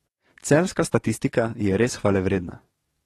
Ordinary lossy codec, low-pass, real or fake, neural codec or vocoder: AAC, 32 kbps; 19.8 kHz; fake; codec, 44.1 kHz, 7.8 kbps, DAC